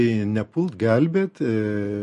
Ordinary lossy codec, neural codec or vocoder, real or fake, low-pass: MP3, 48 kbps; none; real; 14.4 kHz